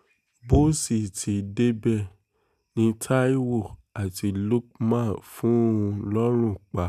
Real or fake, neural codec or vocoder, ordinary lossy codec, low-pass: real; none; none; 14.4 kHz